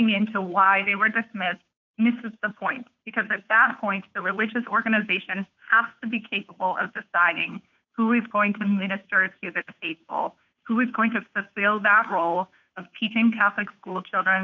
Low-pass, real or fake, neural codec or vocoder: 7.2 kHz; fake; codec, 16 kHz, 2 kbps, FunCodec, trained on Chinese and English, 25 frames a second